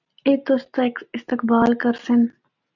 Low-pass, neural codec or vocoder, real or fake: 7.2 kHz; none; real